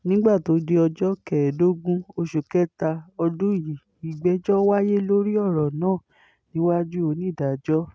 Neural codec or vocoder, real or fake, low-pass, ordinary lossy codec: none; real; none; none